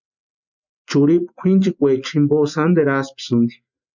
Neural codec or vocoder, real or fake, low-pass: none; real; 7.2 kHz